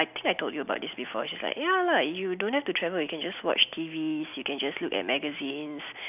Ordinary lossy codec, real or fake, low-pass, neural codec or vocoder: none; real; 3.6 kHz; none